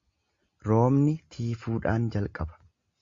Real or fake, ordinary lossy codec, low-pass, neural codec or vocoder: real; Opus, 64 kbps; 7.2 kHz; none